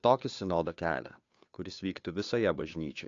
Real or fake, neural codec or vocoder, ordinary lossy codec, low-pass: fake; codec, 16 kHz, 2 kbps, FunCodec, trained on Chinese and English, 25 frames a second; AAC, 48 kbps; 7.2 kHz